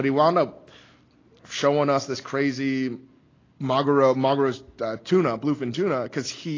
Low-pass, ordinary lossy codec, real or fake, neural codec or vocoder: 7.2 kHz; AAC, 32 kbps; real; none